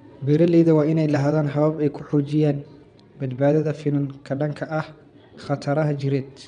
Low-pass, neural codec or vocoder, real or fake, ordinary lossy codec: 9.9 kHz; vocoder, 22.05 kHz, 80 mel bands, WaveNeXt; fake; none